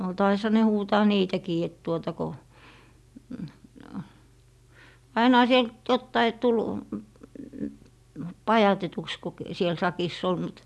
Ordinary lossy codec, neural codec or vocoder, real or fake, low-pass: none; none; real; none